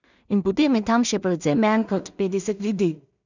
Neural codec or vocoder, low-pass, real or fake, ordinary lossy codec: codec, 16 kHz in and 24 kHz out, 0.4 kbps, LongCat-Audio-Codec, two codebook decoder; 7.2 kHz; fake; none